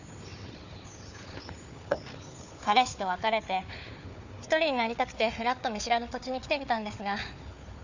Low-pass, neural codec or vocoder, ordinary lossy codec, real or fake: 7.2 kHz; codec, 16 kHz, 4 kbps, FunCodec, trained on Chinese and English, 50 frames a second; none; fake